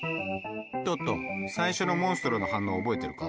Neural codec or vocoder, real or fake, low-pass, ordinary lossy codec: none; real; none; none